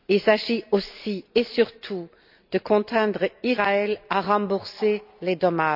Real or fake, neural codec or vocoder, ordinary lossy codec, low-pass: real; none; none; 5.4 kHz